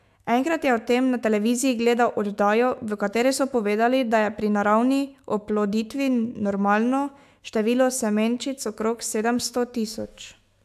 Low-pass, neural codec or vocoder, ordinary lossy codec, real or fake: 14.4 kHz; autoencoder, 48 kHz, 128 numbers a frame, DAC-VAE, trained on Japanese speech; AAC, 96 kbps; fake